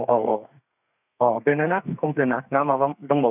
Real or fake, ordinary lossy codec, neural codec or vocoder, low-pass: fake; none; codec, 32 kHz, 1.9 kbps, SNAC; 3.6 kHz